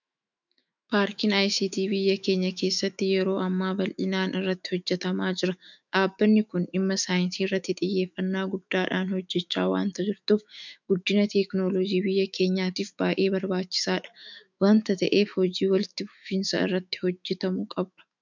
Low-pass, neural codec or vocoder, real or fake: 7.2 kHz; autoencoder, 48 kHz, 128 numbers a frame, DAC-VAE, trained on Japanese speech; fake